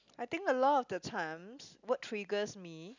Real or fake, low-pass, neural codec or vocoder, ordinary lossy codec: real; 7.2 kHz; none; none